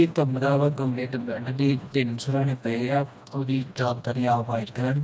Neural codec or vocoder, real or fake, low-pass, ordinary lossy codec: codec, 16 kHz, 1 kbps, FreqCodec, smaller model; fake; none; none